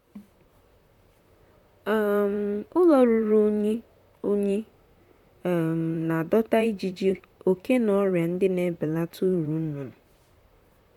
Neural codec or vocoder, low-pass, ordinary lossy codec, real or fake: vocoder, 44.1 kHz, 128 mel bands, Pupu-Vocoder; 19.8 kHz; none; fake